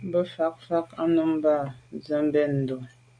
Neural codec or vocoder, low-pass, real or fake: none; 9.9 kHz; real